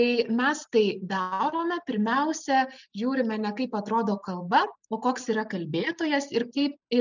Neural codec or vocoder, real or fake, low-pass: none; real; 7.2 kHz